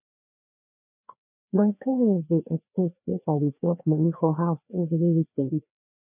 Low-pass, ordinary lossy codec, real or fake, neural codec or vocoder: 3.6 kHz; none; fake; codec, 16 kHz, 2 kbps, FreqCodec, larger model